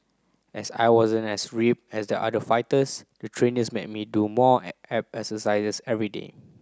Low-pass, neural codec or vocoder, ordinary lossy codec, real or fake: none; none; none; real